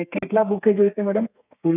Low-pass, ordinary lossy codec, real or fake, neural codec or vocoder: 3.6 kHz; none; fake; codec, 32 kHz, 1.9 kbps, SNAC